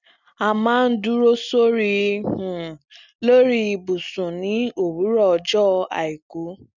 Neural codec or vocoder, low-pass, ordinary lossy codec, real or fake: none; 7.2 kHz; none; real